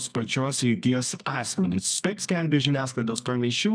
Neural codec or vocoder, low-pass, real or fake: codec, 24 kHz, 0.9 kbps, WavTokenizer, medium music audio release; 9.9 kHz; fake